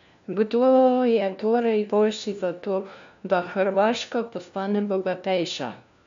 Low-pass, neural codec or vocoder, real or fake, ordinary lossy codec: 7.2 kHz; codec, 16 kHz, 1 kbps, FunCodec, trained on LibriTTS, 50 frames a second; fake; MP3, 64 kbps